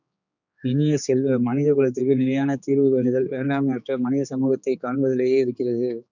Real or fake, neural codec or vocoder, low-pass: fake; codec, 16 kHz, 4 kbps, X-Codec, HuBERT features, trained on general audio; 7.2 kHz